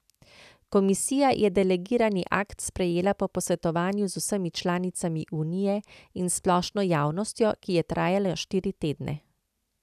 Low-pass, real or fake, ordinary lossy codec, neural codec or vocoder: 14.4 kHz; real; none; none